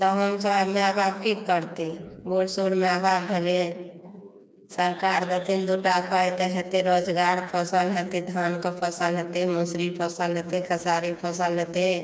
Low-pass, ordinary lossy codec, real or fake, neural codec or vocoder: none; none; fake; codec, 16 kHz, 2 kbps, FreqCodec, smaller model